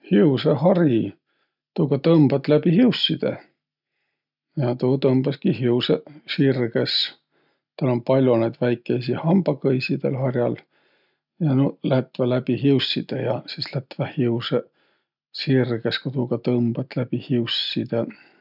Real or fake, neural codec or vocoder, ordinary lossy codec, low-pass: real; none; none; 5.4 kHz